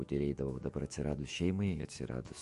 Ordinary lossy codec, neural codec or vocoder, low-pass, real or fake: MP3, 48 kbps; autoencoder, 48 kHz, 128 numbers a frame, DAC-VAE, trained on Japanese speech; 14.4 kHz; fake